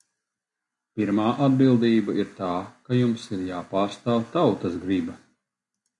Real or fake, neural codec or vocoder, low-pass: real; none; 10.8 kHz